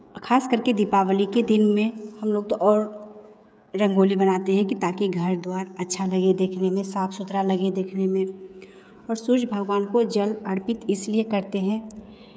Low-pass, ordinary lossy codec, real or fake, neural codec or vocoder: none; none; fake; codec, 16 kHz, 16 kbps, FreqCodec, smaller model